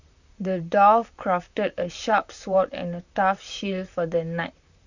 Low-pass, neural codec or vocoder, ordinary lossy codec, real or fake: 7.2 kHz; vocoder, 44.1 kHz, 128 mel bands, Pupu-Vocoder; none; fake